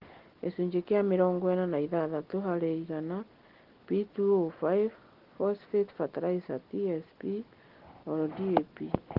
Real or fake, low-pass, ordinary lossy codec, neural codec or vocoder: real; 5.4 kHz; Opus, 16 kbps; none